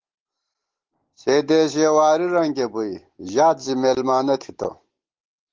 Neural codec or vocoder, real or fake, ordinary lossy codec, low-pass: none; real; Opus, 16 kbps; 7.2 kHz